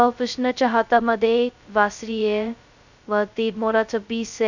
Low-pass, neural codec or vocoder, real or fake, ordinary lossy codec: 7.2 kHz; codec, 16 kHz, 0.2 kbps, FocalCodec; fake; none